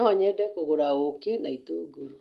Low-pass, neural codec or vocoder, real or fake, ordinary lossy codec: 14.4 kHz; none; real; Opus, 24 kbps